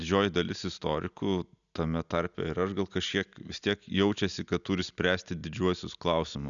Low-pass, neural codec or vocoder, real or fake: 7.2 kHz; none; real